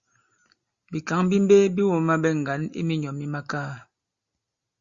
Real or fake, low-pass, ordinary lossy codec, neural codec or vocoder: real; 7.2 kHz; Opus, 64 kbps; none